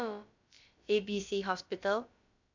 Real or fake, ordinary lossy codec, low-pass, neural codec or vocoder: fake; MP3, 64 kbps; 7.2 kHz; codec, 16 kHz, about 1 kbps, DyCAST, with the encoder's durations